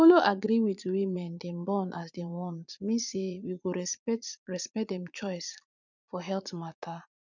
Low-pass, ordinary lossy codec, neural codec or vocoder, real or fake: 7.2 kHz; none; vocoder, 44.1 kHz, 80 mel bands, Vocos; fake